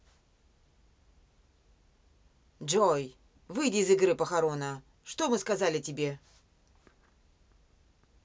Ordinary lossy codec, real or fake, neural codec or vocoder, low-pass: none; real; none; none